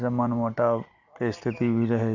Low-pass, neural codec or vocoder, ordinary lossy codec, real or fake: 7.2 kHz; none; none; real